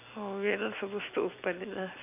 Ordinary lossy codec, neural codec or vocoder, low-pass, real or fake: none; none; 3.6 kHz; real